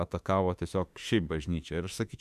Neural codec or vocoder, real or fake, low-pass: autoencoder, 48 kHz, 32 numbers a frame, DAC-VAE, trained on Japanese speech; fake; 14.4 kHz